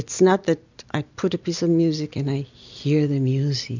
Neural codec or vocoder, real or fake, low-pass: none; real; 7.2 kHz